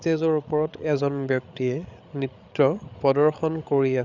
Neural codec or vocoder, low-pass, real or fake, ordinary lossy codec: codec, 16 kHz, 8 kbps, FreqCodec, larger model; 7.2 kHz; fake; none